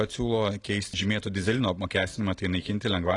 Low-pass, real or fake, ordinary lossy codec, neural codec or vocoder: 10.8 kHz; real; AAC, 32 kbps; none